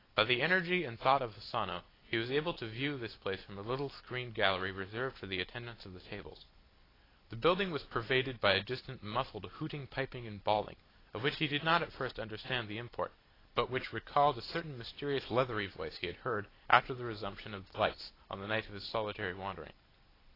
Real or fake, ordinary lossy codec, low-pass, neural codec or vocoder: real; AAC, 24 kbps; 5.4 kHz; none